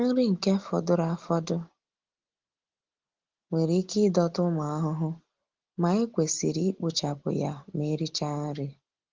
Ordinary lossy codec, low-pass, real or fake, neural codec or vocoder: Opus, 16 kbps; 7.2 kHz; real; none